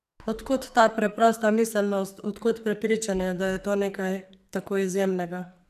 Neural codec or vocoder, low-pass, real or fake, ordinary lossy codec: codec, 44.1 kHz, 2.6 kbps, SNAC; 14.4 kHz; fake; none